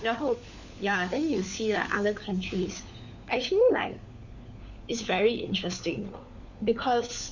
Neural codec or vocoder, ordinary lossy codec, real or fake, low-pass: codec, 16 kHz, 4 kbps, FunCodec, trained on LibriTTS, 50 frames a second; none; fake; 7.2 kHz